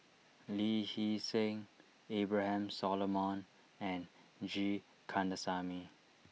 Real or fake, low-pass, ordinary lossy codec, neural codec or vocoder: real; none; none; none